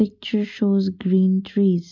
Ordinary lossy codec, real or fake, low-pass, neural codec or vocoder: MP3, 64 kbps; real; 7.2 kHz; none